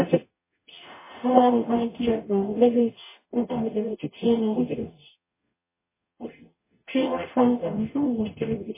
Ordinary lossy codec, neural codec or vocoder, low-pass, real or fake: MP3, 16 kbps; codec, 44.1 kHz, 0.9 kbps, DAC; 3.6 kHz; fake